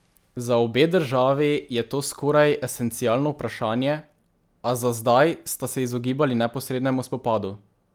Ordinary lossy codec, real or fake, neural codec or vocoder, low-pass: Opus, 32 kbps; real; none; 19.8 kHz